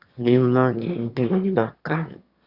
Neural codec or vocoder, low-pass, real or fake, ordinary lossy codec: autoencoder, 22.05 kHz, a latent of 192 numbers a frame, VITS, trained on one speaker; 5.4 kHz; fake; Opus, 64 kbps